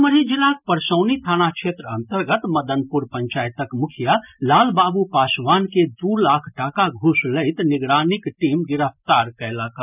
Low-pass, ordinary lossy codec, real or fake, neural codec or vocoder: 3.6 kHz; none; real; none